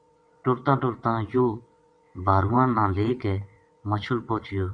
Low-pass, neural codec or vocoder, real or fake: 9.9 kHz; vocoder, 22.05 kHz, 80 mel bands, WaveNeXt; fake